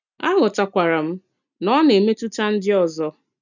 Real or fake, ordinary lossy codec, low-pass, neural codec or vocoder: real; none; 7.2 kHz; none